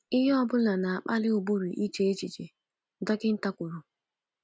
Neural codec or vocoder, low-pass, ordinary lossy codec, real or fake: none; none; none; real